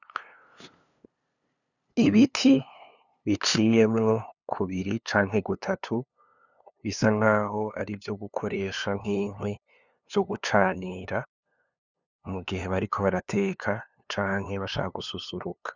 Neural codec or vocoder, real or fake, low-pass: codec, 16 kHz, 2 kbps, FunCodec, trained on LibriTTS, 25 frames a second; fake; 7.2 kHz